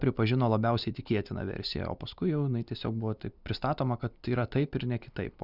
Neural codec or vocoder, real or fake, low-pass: none; real; 5.4 kHz